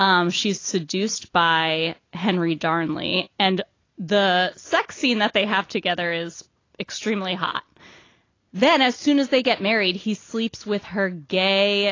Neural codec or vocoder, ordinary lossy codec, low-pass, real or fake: none; AAC, 32 kbps; 7.2 kHz; real